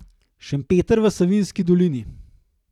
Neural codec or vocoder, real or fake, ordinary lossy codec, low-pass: vocoder, 44.1 kHz, 128 mel bands every 256 samples, BigVGAN v2; fake; none; 19.8 kHz